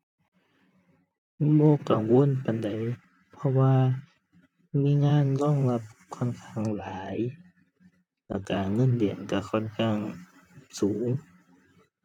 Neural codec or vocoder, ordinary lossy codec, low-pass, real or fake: vocoder, 44.1 kHz, 128 mel bands, Pupu-Vocoder; none; 19.8 kHz; fake